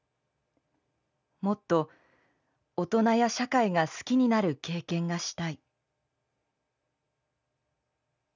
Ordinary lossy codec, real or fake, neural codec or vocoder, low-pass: none; real; none; 7.2 kHz